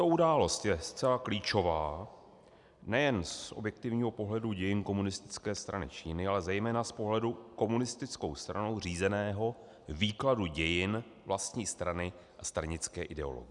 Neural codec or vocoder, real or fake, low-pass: none; real; 10.8 kHz